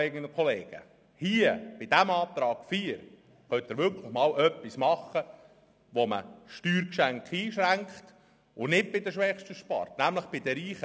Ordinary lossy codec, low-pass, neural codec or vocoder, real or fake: none; none; none; real